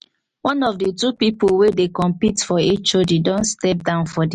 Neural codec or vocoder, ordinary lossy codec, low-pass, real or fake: none; MP3, 48 kbps; 14.4 kHz; real